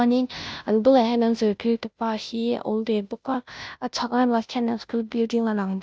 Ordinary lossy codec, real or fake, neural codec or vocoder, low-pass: none; fake; codec, 16 kHz, 0.5 kbps, FunCodec, trained on Chinese and English, 25 frames a second; none